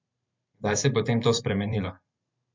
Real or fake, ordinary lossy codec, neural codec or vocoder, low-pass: fake; MP3, 64 kbps; vocoder, 44.1 kHz, 128 mel bands every 512 samples, BigVGAN v2; 7.2 kHz